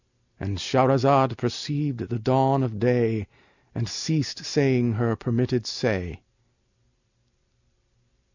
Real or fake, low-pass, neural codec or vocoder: real; 7.2 kHz; none